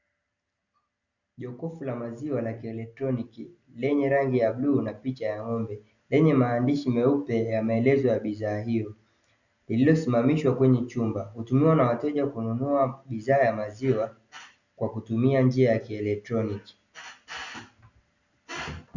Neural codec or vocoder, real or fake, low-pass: none; real; 7.2 kHz